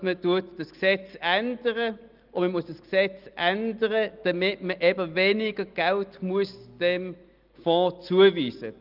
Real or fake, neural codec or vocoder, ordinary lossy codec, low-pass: real; none; Opus, 24 kbps; 5.4 kHz